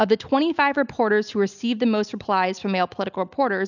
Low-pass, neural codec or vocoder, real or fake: 7.2 kHz; none; real